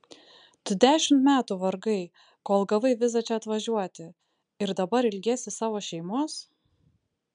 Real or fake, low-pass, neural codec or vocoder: real; 9.9 kHz; none